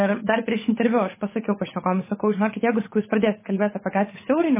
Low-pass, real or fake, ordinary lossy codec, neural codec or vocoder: 3.6 kHz; fake; MP3, 16 kbps; codec, 16 kHz, 8 kbps, FunCodec, trained on Chinese and English, 25 frames a second